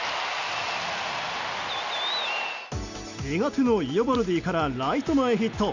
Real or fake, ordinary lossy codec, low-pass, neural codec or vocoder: real; Opus, 64 kbps; 7.2 kHz; none